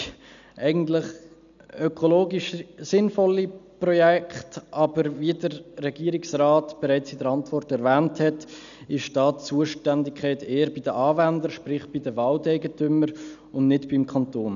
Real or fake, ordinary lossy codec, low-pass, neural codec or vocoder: real; none; 7.2 kHz; none